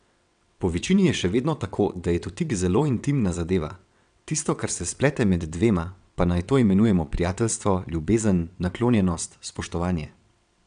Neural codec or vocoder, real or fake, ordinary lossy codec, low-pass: vocoder, 22.05 kHz, 80 mel bands, WaveNeXt; fake; none; 9.9 kHz